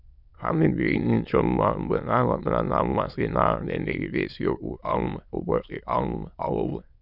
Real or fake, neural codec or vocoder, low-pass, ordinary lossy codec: fake; autoencoder, 22.05 kHz, a latent of 192 numbers a frame, VITS, trained on many speakers; 5.4 kHz; none